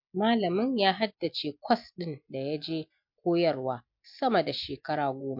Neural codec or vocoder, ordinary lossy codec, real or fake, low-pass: none; MP3, 48 kbps; real; 5.4 kHz